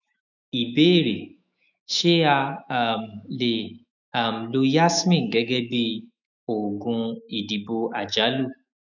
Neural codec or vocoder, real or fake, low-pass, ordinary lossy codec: autoencoder, 48 kHz, 128 numbers a frame, DAC-VAE, trained on Japanese speech; fake; 7.2 kHz; none